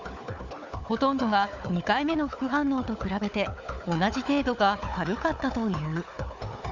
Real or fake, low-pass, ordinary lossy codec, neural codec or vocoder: fake; 7.2 kHz; none; codec, 16 kHz, 4 kbps, FunCodec, trained on Chinese and English, 50 frames a second